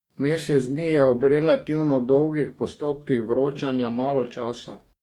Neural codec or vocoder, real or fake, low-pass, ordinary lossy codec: codec, 44.1 kHz, 2.6 kbps, DAC; fake; 19.8 kHz; Opus, 64 kbps